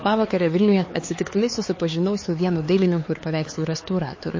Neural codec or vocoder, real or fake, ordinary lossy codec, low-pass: codec, 16 kHz, 4 kbps, X-Codec, HuBERT features, trained on LibriSpeech; fake; MP3, 32 kbps; 7.2 kHz